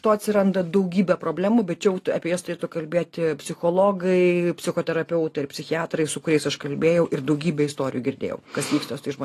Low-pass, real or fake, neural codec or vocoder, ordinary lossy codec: 14.4 kHz; real; none; AAC, 48 kbps